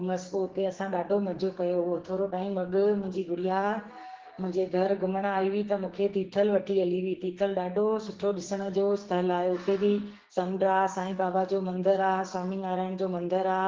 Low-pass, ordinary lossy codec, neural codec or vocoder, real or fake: 7.2 kHz; Opus, 16 kbps; codec, 44.1 kHz, 2.6 kbps, SNAC; fake